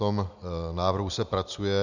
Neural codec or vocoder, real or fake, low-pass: none; real; 7.2 kHz